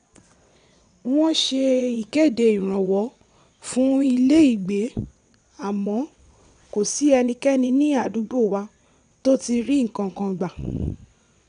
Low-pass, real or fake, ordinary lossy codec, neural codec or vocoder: 9.9 kHz; fake; none; vocoder, 22.05 kHz, 80 mel bands, WaveNeXt